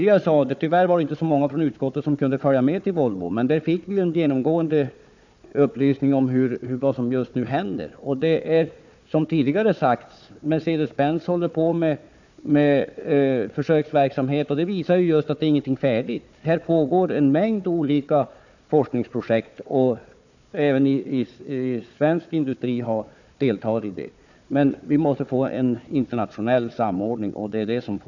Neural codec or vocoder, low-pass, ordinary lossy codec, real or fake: codec, 16 kHz, 4 kbps, FunCodec, trained on Chinese and English, 50 frames a second; 7.2 kHz; none; fake